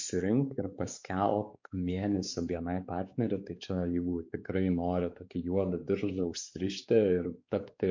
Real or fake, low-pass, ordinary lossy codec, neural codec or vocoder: fake; 7.2 kHz; MP3, 48 kbps; codec, 16 kHz, 4 kbps, X-Codec, WavLM features, trained on Multilingual LibriSpeech